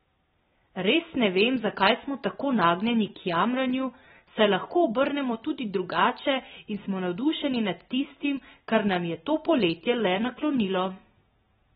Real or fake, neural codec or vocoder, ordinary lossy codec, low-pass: real; none; AAC, 16 kbps; 19.8 kHz